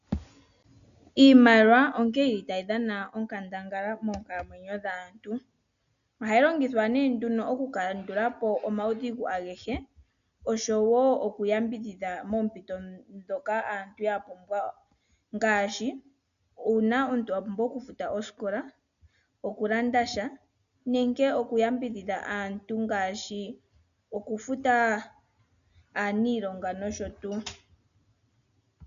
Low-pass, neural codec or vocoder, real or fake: 7.2 kHz; none; real